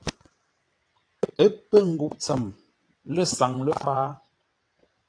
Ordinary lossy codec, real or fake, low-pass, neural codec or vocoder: AAC, 48 kbps; fake; 9.9 kHz; vocoder, 22.05 kHz, 80 mel bands, WaveNeXt